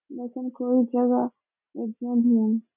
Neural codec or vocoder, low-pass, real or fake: none; 3.6 kHz; real